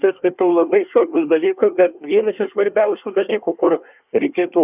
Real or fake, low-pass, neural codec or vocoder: fake; 3.6 kHz; codec, 24 kHz, 1 kbps, SNAC